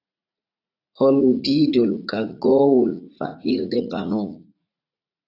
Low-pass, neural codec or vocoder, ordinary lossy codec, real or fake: 5.4 kHz; vocoder, 44.1 kHz, 80 mel bands, Vocos; AAC, 32 kbps; fake